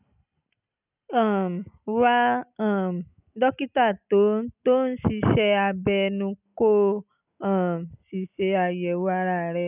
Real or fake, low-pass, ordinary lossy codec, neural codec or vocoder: real; 3.6 kHz; none; none